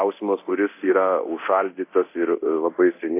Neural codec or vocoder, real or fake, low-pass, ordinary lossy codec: codec, 24 kHz, 0.9 kbps, DualCodec; fake; 3.6 kHz; AAC, 24 kbps